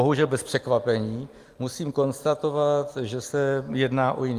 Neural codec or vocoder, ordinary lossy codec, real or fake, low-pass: none; Opus, 32 kbps; real; 14.4 kHz